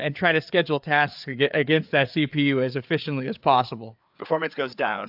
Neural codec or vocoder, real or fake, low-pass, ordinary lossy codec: codec, 16 kHz, 4 kbps, FreqCodec, larger model; fake; 5.4 kHz; AAC, 48 kbps